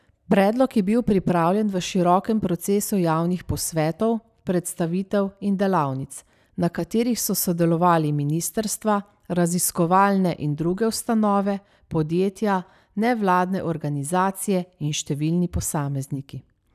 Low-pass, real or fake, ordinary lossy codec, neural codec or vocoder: 14.4 kHz; real; none; none